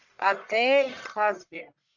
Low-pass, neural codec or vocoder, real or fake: 7.2 kHz; codec, 44.1 kHz, 1.7 kbps, Pupu-Codec; fake